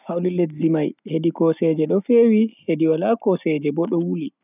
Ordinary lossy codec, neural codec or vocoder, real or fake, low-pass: none; none; real; 3.6 kHz